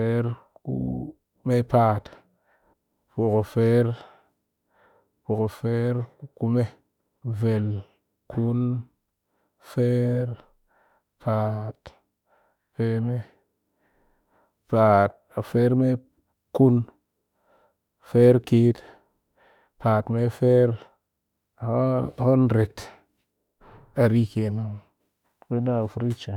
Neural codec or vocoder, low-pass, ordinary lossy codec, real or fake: autoencoder, 48 kHz, 32 numbers a frame, DAC-VAE, trained on Japanese speech; 19.8 kHz; none; fake